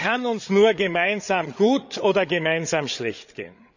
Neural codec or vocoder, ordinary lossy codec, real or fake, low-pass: codec, 16 kHz, 8 kbps, FreqCodec, larger model; none; fake; 7.2 kHz